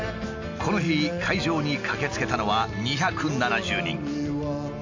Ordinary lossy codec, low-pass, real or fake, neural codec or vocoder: AAC, 48 kbps; 7.2 kHz; real; none